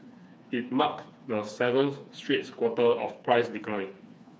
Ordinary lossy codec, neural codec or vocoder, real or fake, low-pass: none; codec, 16 kHz, 4 kbps, FreqCodec, smaller model; fake; none